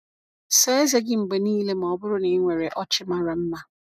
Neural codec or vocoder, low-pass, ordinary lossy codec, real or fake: none; 14.4 kHz; none; real